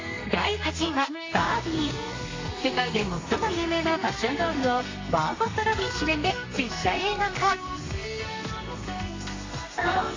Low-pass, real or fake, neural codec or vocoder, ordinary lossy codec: 7.2 kHz; fake; codec, 32 kHz, 1.9 kbps, SNAC; AAC, 32 kbps